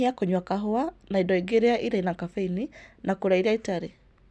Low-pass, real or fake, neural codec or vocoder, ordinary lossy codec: none; real; none; none